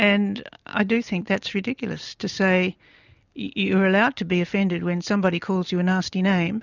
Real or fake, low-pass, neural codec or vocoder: real; 7.2 kHz; none